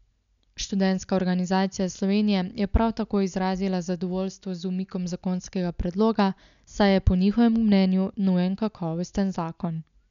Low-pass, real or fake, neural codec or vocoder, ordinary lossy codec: 7.2 kHz; real; none; none